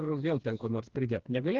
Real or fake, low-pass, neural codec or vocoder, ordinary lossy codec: fake; 7.2 kHz; codec, 16 kHz, 2 kbps, FreqCodec, smaller model; Opus, 16 kbps